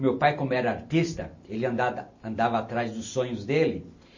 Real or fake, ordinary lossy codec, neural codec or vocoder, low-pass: real; MP3, 32 kbps; none; 7.2 kHz